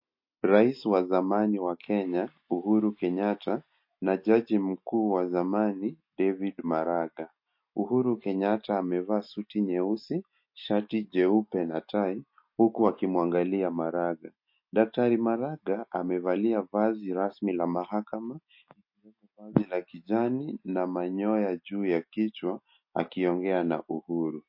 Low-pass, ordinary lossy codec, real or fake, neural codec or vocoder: 5.4 kHz; MP3, 32 kbps; real; none